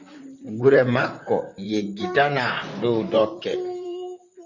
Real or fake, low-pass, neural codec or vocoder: fake; 7.2 kHz; vocoder, 44.1 kHz, 128 mel bands, Pupu-Vocoder